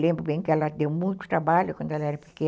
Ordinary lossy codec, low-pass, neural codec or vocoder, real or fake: none; none; none; real